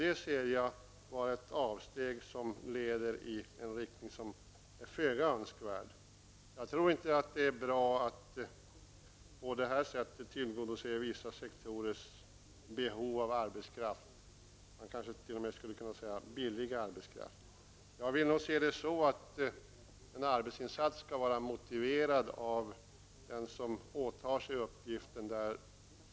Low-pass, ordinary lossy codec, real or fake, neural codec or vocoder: none; none; real; none